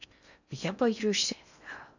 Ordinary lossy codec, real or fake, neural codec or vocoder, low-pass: none; fake; codec, 16 kHz in and 24 kHz out, 0.6 kbps, FocalCodec, streaming, 4096 codes; 7.2 kHz